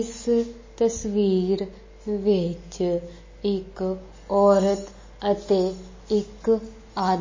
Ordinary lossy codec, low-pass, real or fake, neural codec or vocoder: MP3, 32 kbps; 7.2 kHz; real; none